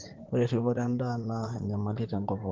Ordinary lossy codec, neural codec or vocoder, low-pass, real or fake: Opus, 16 kbps; vocoder, 44.1 kHz, 128 mel bands, Pupu-Vocoder; 7.2 kHz; fake